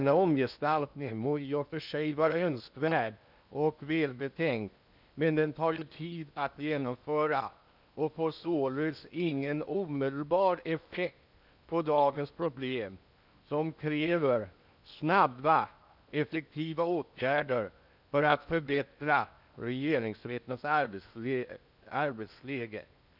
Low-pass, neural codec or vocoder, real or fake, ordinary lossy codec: 5.4 kHz; codec, 16 kHz in and 24 kHz out, 0.8 kbps, FocalCodec, streaming, 65536 codes; fake; none